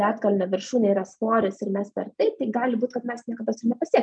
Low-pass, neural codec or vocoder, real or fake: 9.9 kHz; none; real